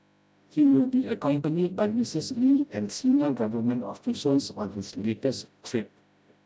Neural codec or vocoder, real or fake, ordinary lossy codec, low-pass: codec, 16 kHz, 0.5 kbps, FreqCodec, smaller model; fake; none; none